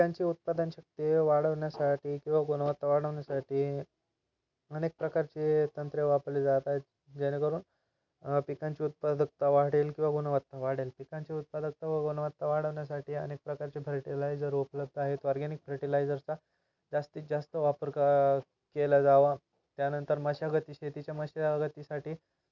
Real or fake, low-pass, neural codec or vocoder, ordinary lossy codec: real; 7.2 kHz; none; none